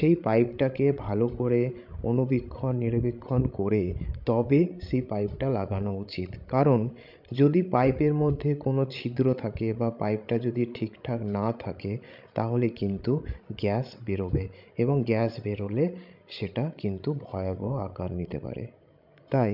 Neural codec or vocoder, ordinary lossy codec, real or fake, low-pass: codec, 16 kHz, 16 kbps, FreqCodec, larger model; none; fake; 5.4 kHz